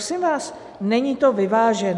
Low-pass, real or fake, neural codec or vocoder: 10.8 kHz; real; none